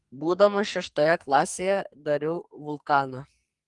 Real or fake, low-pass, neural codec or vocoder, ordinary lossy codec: fake; 10.8 kHz; codec, 44.1 kHz, 2.6 kbps, SNAC; Opus, 24 kbps